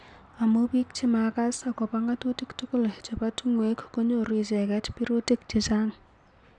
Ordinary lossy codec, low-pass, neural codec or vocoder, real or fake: none; 10.8 kHz; none; real